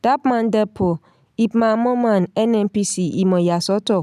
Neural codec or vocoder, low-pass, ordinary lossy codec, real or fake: none; 14.4 kHz; none; real